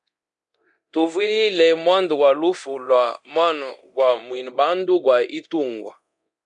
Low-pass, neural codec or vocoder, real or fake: 10.8 kHz; codec, 24 kHz, 0.9 kbps, DualCodec; fake